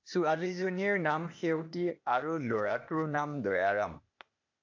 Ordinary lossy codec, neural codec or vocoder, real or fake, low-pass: AAC, 48 kbps; codec, 16 kHz, 0.8 kbps, ZipCodec; fake; 7.2 kHz